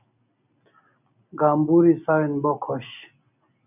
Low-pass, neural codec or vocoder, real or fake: 3.6 kHz; none; real